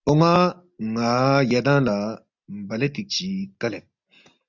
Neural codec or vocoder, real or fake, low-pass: none; real; 7.2 kHz